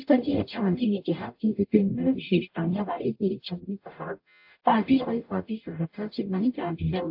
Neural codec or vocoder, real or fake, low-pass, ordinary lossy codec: codec, 44.1 kHz, 0.9 kbps, DAC; fake; 5.4 kHz; AAC, 48 kbps